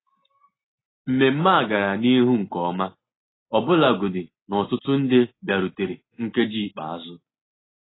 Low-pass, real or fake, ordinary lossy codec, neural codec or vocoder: 7.2 kHz; real; AAC, 16 kbps; none